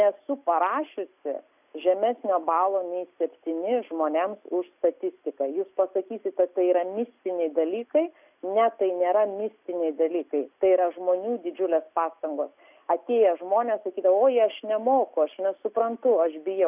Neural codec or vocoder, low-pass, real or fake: none; 3.6 kHz; real